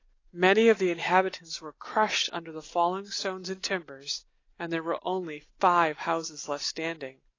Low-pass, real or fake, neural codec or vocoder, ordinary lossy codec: 7.2 kHz; real; none; AAC, 32 kbps